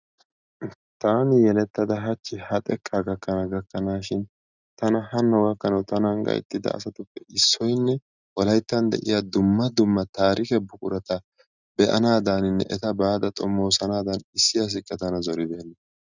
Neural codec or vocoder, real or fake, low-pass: none; real; 7.2 kHz